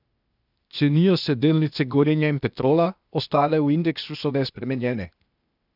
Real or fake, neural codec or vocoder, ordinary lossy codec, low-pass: fake; codec, 16 kHz, 0.8 kbps, ZipCodec; none; 5.4 kHz